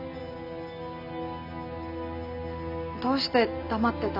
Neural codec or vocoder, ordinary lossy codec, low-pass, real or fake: none; none; 5.4 kHz; real